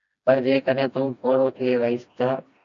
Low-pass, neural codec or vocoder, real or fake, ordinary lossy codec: 7.2 kHz; codec, 16 kHz, 1 kbps, FreqCodec, smaller model; fake; MP3, 64 kbps